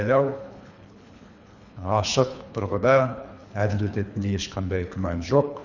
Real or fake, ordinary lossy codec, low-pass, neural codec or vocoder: fake; none; 7.2 kHz; codec, 24 kHz, 3 kbps, HILCodec